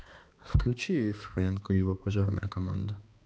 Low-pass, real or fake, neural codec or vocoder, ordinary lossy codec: none; fake; codec, 16 kHz, 2 kbps, X-Codec, HuBERT features, trained on balanced general audio; none